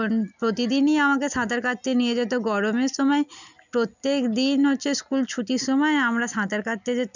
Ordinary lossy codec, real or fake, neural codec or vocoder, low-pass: none; real; none; 7.2 kHz